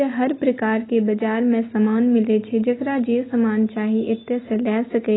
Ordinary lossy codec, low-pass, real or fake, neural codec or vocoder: AAC, 16 kbps; 7.2 kHz; real; none